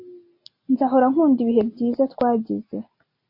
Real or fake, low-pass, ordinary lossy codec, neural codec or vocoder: real; 5.4 kHz; MP3, 32 kbps; none